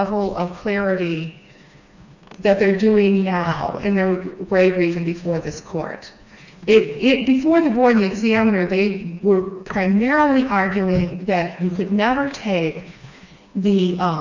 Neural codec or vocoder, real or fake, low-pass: codec, 16 kHz, 2 kbps, FreqCodec, smaller model; fake; 7.2 kHz